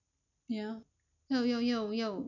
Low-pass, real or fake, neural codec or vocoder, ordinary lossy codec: 7.2 kHz; real; none; none